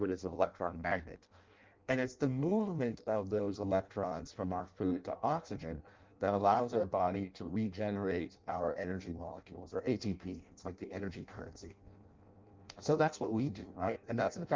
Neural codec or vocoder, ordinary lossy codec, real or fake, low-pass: codec, 16 kHz in and 24 kHz out, 0.6 kbps, FireRedTTS-2 codec; Opus, 32 kbps; fake; 7.2 kHz